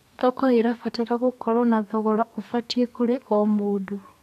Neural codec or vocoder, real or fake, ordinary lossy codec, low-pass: codec, 32 kHz, 1.9 kbps, SNAC; fake; none; 14.4 kHz